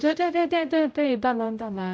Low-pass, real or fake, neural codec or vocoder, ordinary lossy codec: none; fake; codec, 16 kHz, 0.5 kbps, X-Codec, HuBERT features, trained on general audio; none